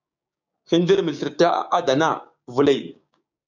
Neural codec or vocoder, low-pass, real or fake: codec, 16 kHz, 6 kbps, DAC; 7.2 kHz; fake